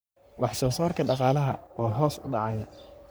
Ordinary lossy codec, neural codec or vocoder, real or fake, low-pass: none; codec, 44.1 kHz, 3.4 kbps, Pupu-Codec; fake; none